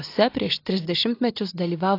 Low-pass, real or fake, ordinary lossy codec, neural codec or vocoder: 5.4 kHz; real; AAC, 32 kbps; none